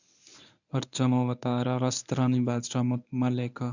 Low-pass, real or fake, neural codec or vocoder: 7.2 kHz; fake; codec, 24 kHz, 0.9 kbps, WavTokenizer, medium speech release version 1